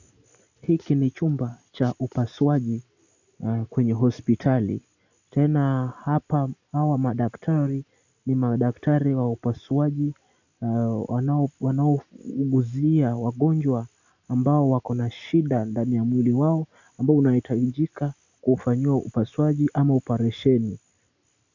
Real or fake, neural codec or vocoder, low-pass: real; none; 7.2 kHz